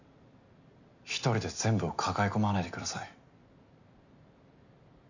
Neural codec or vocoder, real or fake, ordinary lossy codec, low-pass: none; real; none; 7.2 kHz